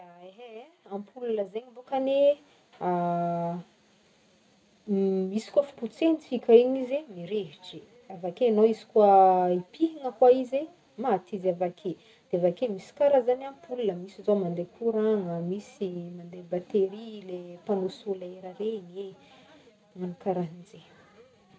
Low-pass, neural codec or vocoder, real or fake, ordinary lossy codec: none; none; real; none